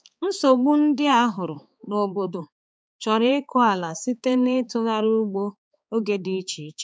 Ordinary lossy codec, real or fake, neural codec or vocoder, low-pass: none; fake; codec, 16 kHz, 4 kbps, X-Codec, HuBERT features, trained on balanced general audio; none